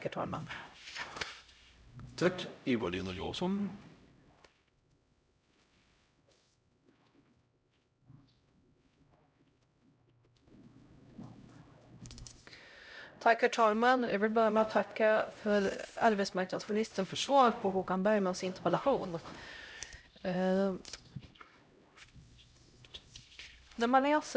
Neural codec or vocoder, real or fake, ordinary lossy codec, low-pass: codec, 16 kHz, 0.5 kbps, X-Codec, HuBERT features, trained on LibriSpeech; fake; none; none